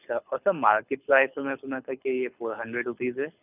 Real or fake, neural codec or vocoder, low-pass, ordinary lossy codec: fake; codec, 24 kHz, 6 kbps, HILCodec; 3.6 kHz; none